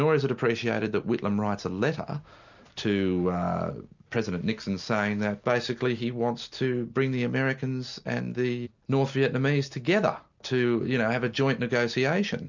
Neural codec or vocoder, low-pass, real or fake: none; 7.2 kHz; real